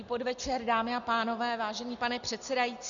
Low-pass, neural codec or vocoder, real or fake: 7.2 kHz; none; real